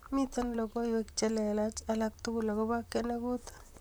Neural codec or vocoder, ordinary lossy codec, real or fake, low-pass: vocoder, 44.1 kHz, 128 mel bands, Pupu-Vocoder; none; fake; none